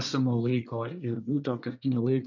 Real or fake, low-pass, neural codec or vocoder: fake; 7.2 kHz; codec, 24 kHz, 1 kbps, SNAC